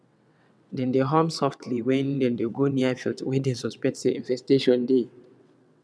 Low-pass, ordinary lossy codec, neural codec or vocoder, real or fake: none; none; vocoder, 22.05 kHz, 80 mel bands, WaveNeXt; fake